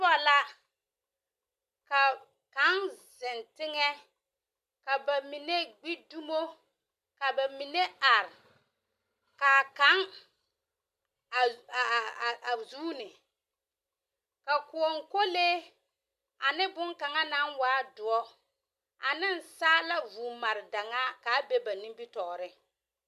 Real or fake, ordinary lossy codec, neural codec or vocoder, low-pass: real; MP3, 96 kbps; none; 14.4 kHz